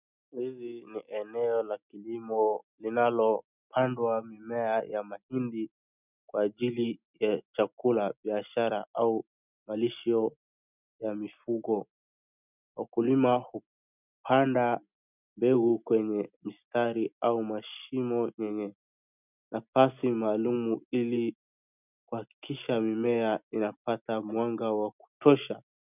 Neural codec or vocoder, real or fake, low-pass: none; real; 3.6 kHz